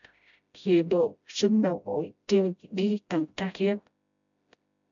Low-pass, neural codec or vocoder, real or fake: 7.2 kHz; codec, 16 kHz, 0.5 kbps, FreqCodec, smaller model; fake